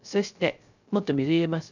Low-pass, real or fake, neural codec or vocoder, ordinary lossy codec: 7.2 kHz; fake; codec, 16 kHz, 0.3 kbps, FocalCodec; none